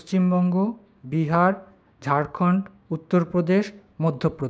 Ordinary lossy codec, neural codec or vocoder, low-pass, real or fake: none; codec, 16 kHz, 6 kbps, DAC; none; fake